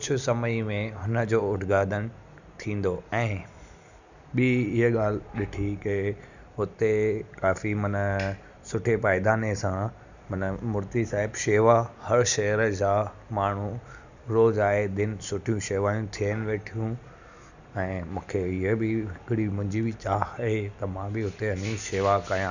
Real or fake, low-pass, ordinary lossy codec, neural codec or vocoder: real; 7.2 kHz; none; none